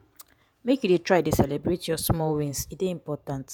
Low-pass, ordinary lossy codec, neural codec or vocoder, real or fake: none; none; vocoder, 48 kHz, 128 mel bands, Vocos; fake